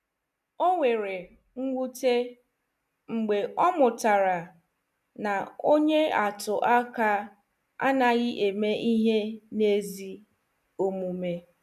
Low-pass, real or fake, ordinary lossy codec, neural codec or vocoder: 14.4 kHz; real; none; none